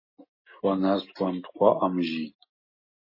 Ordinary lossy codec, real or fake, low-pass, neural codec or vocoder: MP3, 24 kbps; real; 5.4 kHz; none